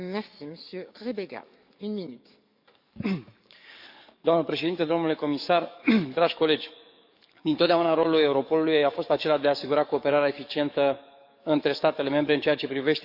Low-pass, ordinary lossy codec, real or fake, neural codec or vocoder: 5.4 kHz; none; fake; codec, 44.1 kHz, 7.8 kbps, DAC